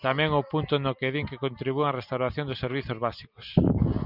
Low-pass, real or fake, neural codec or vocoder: 5.4 kHz; real; none